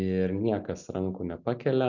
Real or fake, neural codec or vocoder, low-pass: real; none; 7.2 kHz